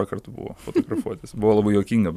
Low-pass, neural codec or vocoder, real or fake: 14.4 kHz; vocoder, 44.1 kHz, 128 mel bands every 512 samples, BigVGAN v2; fake